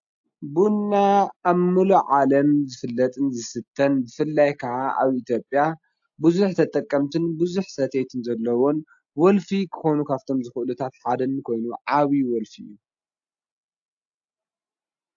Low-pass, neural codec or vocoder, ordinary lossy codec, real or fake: 7.2 kHz; none; AAC, 64 kbps; real